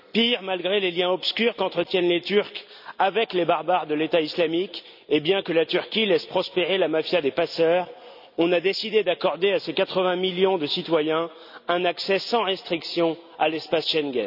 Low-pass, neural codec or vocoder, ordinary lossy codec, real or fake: 5.4 kHz; none; none; real